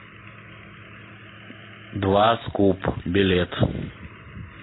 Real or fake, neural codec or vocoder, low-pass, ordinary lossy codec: real; none; 7.2 kHz; AAC, 16 kbps